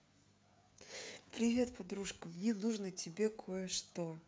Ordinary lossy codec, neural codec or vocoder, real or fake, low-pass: none; codec, 16 kHz, 16 kbps, FreqCodec, smaller model; fake; none